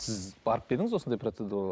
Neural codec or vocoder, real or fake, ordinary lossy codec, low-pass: none; real; none; none